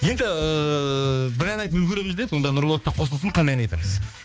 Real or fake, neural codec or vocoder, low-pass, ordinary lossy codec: fake; codec, 16 kHz, 2 kbps, X-Codec, HuBERT features, trained on balanced general audio; none; none